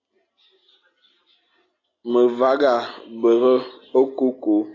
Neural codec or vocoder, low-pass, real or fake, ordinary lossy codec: none; 7.2 kHz; real; AAC, 32 kbps